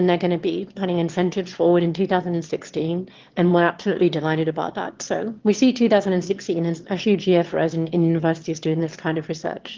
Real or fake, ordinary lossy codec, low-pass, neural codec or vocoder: fake; Opus, 16 kbps; 7.2 kHz; autoencoder, 22.05 kHz, a latent of 192 numbers a frame, VITS, trained on one speaker